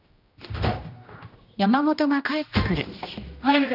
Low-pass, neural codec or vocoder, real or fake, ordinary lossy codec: 5.4 kHz; codec, 16 kHz, 1 kbps, X-Codec, HuBERT features, trained on general audio; fake; none